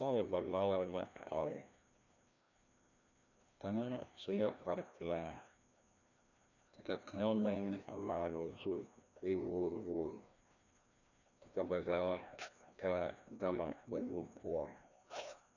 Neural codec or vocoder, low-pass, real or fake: codec, 16 kHz, 1 kbps, FreqCodec, larger model; 7.2 kHz; fake